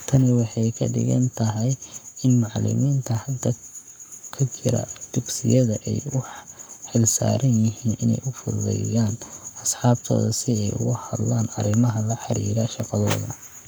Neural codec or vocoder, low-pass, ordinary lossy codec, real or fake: codec, 44.1 kHz, 7.8 kbps, Pupu-Codec; none; none; fake